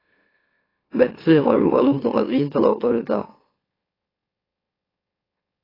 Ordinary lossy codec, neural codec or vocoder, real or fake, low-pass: AAC, 24 kbps; autoencoder, 44.1 kHz, a latent of 192 numbers a frame, MeloTTS; fake; 5.4 kHz